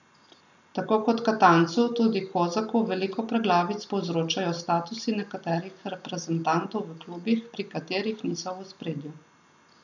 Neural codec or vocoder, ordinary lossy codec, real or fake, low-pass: none; none; real; 7.2 kHz